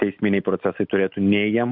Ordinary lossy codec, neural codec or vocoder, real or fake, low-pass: AAC, 48 kbps; none; real; 5.4 kHz